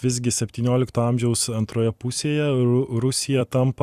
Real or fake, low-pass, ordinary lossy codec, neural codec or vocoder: fake; 14.4 kHz; Opus, 64 kbps; vocoder, 44.1 kHz, 128 mel bands every 256 samples, BigVGAN v2